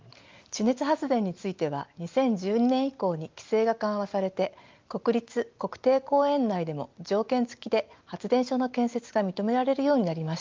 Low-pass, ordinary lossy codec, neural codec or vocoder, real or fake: 7.2 kHz; Opus, 32 kbps; none; real